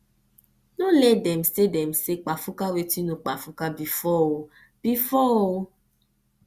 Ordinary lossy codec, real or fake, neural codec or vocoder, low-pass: Opus, 64 kbps; real; none; 14.4 kHz